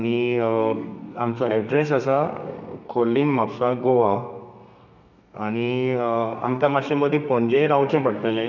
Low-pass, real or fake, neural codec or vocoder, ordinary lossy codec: 7.2 kHz; fake; codec, 32 kHz, 1.9 kbps, SNAC; none